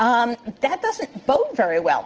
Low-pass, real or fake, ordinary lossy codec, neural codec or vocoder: 7.2 kHz; real; Opus, 16 kbps; none